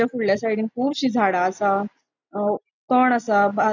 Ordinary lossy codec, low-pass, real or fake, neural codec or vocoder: none; 7.2 kHz; real; none